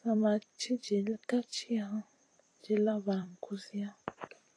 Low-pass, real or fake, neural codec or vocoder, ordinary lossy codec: 9.9 kHz; fake; vocoder, 44.1 kHz, 128 mel bands every 256 samples, BigVGAN v2; AAC, 32 kbps